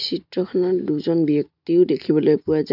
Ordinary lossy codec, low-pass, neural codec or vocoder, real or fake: none; 5.4 kHz; none; real